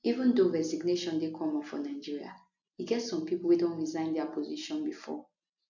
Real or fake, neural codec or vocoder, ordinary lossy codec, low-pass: real; none; none; 7.2 kHz